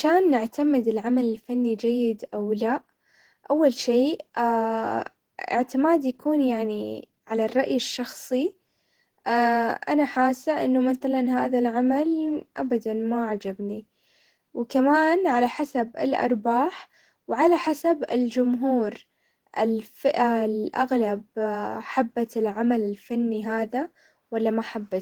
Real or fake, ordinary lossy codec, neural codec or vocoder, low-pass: fake; Opus, 16 kbps; vocoder, 48 kHz, 128 mel bands, Vocos; 19.8 kHz